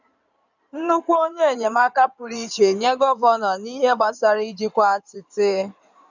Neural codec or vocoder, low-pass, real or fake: codec, 16 kHz in and 24 kHz out, 2.2 kbps, FireRedTTS-2 codec; 7.2 kHz; fake